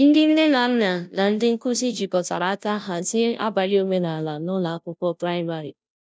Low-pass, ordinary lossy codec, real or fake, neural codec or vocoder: none; none; fake; codec, 16 kHz, 0.5 kbps, FunCodec, trained on Chinese and English, 25 frames a second